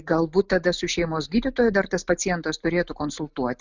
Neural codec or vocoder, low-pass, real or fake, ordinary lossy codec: none; 7.2 kHz; real; Opus, 64 kbps